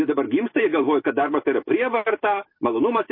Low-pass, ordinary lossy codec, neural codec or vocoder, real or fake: 5.4 kHz; MP3, 24 kbps; none; real